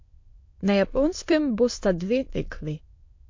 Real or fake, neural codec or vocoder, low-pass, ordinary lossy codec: fake; autoencoder, 22.05 kHz, a latent of 192 numbers a frame, VITS, trained on many speakers; 7.2 kHz; MP3, 48 kbps